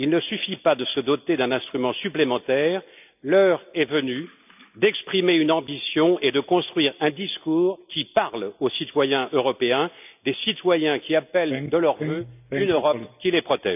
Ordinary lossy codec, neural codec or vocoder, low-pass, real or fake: none; none; 3.6 kHz; real